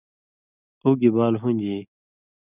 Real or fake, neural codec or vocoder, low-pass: real; none; 3.6 kHz